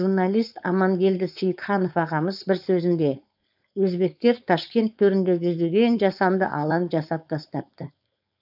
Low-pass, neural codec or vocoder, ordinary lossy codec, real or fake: 5.4 kHz; codec, 16 kHz, 4.8 kbps, FACodec; none; fake